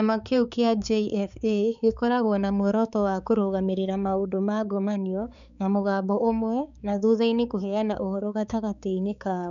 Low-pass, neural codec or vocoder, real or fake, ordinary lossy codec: 7.2 kHz; codec, 16 kHz, 4 kbps, X-Codec, HuBERT features, trained on balanced general audio; fake; none